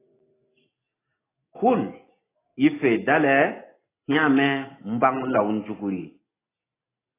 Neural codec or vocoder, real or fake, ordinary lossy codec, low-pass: none; real; AAC, 16 kbps; 3.6 kHz